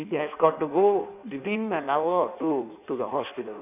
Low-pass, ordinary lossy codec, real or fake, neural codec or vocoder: 3.6 kHz; none; fake; codec, 16 kHz in and 24 kHz out, 1.1 kbps, FireRedTTS-2 codec